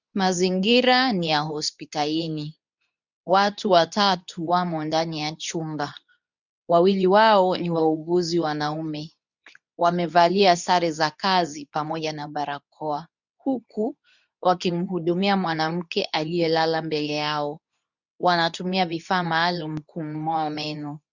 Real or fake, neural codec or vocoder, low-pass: fake; codec, 24 kHz, 0.9 kbps, WavTokenizer, medium speech release version 2; 7.2 kHz